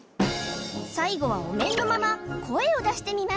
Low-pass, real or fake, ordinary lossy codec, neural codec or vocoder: none; real; none; none